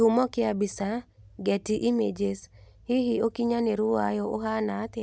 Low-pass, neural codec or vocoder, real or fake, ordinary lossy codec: none; none; real; none